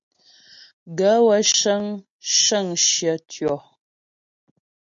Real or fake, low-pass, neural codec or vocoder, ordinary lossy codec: real; 7.2 kHz; none; MP3, 64 kbps